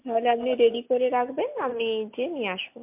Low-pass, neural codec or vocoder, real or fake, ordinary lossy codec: 3.6 kHz; none; real; MP3, 32 kbps